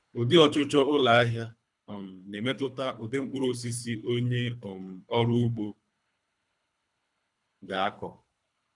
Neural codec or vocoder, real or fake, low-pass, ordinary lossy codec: codec, 24 kHz, 3 kbps, HILCodec; fake; 10.8 kHz; none